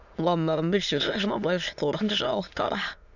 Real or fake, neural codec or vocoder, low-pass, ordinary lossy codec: fake; autoencoder, 22.05 kHz, a latent of 192 numbers a frame, VITS, trained on many speakers; 7.2 kHz; none